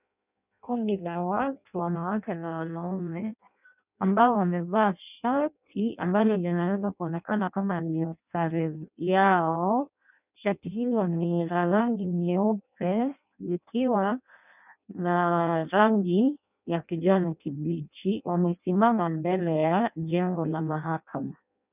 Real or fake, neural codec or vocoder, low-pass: fake; codec, 16 kHz in and 24 kHz out, 0.6 kbps, FireRedTTS-2 codec; 3.6 kHz